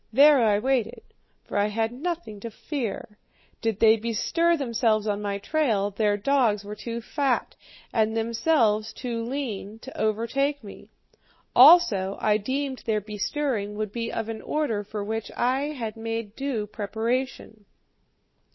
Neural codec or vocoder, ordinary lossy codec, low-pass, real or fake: none; MP3, 24 kbps; 7.2 kHz; real